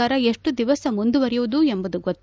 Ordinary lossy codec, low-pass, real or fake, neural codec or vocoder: none; none; real; none